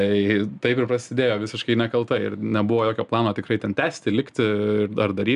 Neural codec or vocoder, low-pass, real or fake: none; 10.8 kHz; real